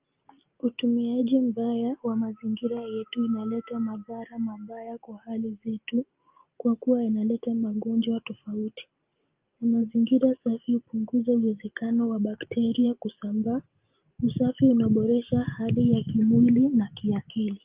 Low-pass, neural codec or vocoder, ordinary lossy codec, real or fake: 3.6 kHz; none; Opus, 32 kbps; real